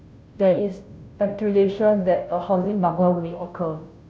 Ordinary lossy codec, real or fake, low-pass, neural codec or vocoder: none; fake; none; codec, 16 kHz, 0.5 kbps, FunCodec, trained on Chinese and English, 25 frames a second